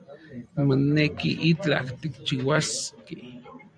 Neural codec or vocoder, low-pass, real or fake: vocoder, 44.1 kHz, 128 mel bands every 256 samples, BigVGAN v2; 9.9 kHz; fake